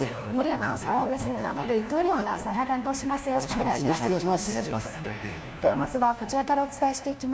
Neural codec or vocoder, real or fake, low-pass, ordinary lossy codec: codec, 16 kHz, 1 kbps, FunCodec, trained on LibriTTS, 50 frames a second; fake; none; none